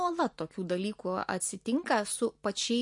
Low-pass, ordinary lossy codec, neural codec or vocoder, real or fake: 10.8 kHz; MP3, 48 kbps; none; real